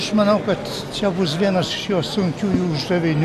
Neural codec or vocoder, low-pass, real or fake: none; 14.4 kHz; real